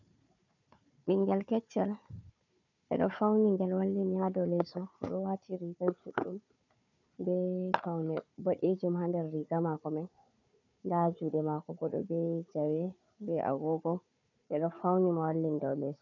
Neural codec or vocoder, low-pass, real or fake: codec, 16 kHz, 4 kbps, FunCodec, trained on Chinese and English, 50 frames a second; 7.2 kHz; fake